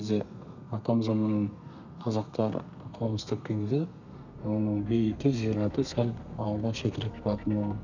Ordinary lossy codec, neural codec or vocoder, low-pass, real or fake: none; codec, 32 kHz, 1.9 kbps, SNAC; 7.2 kHz; fake